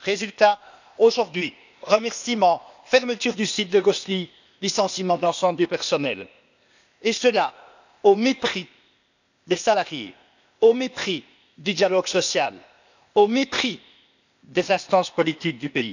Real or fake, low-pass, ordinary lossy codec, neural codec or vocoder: fake; 7.2 kHz; none; codec, 16 kHz, 0.8 kbps, ZipCodec